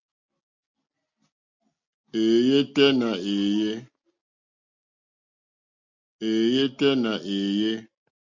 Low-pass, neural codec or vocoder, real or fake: 7.2 kHz; none; real